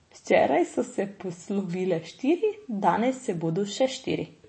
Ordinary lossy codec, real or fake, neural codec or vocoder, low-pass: MP3, 32 kbps; real; none; 9.9 kHz